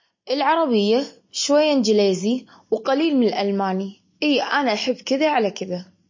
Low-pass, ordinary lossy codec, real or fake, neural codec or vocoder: 7.2 kHz; MP3, 32 kbps; real; none